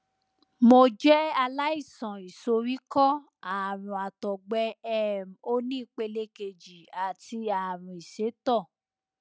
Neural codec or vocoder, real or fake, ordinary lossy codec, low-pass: none; real; none; none